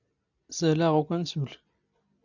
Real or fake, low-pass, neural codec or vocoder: real; 7.2 kHz; none